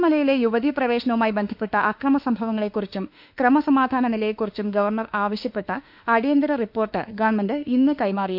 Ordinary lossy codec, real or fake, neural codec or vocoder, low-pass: none; fake; autoencoder, 48 kHz, 32 numbers a frame, DAC-VAE, trained on Japanese speech; 5.4 kHz